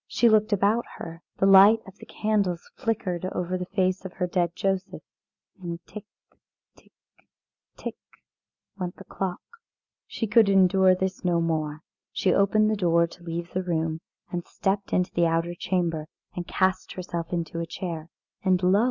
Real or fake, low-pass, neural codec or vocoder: real; 7.2 kHz; none